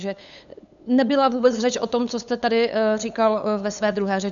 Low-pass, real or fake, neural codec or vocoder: 7.2 kHz; fake; codec, 16 kHz, 8 kbps, FunCodec, trained on LibriTTS, 25 frames a second